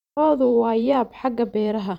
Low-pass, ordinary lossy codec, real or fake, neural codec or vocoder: 19.8 kHz; none; fake; vocoder, 44.1 kHz, 128 mel bands every 512 samples, BigVGAN v2